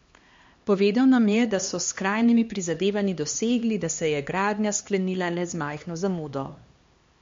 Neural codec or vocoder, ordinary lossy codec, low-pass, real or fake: codec, 16 kHz, 2 kbps, X-Codec, HuBERT features, trained on LibriSpeech; MP3, 48 kbps; 7.2 kHz; fake